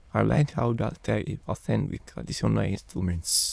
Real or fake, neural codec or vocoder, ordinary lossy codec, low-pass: fake; autoencoder, 22.05 kHz, a latent of 192 numbers a frame, VITS, trained on many speakers; none; none